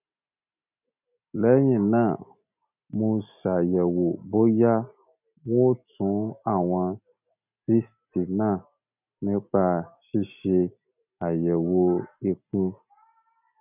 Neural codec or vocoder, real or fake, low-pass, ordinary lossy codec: none; real; 3.6 kHz; none